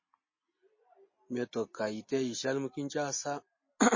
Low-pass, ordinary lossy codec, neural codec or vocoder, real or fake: 7.2 kHz; MP3, 32 kbps; none; real